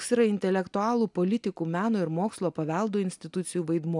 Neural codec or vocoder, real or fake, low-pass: none; real; 10.8 kHz